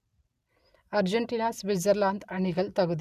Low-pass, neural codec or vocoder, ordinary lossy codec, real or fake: 14.4 kHz; none; none; real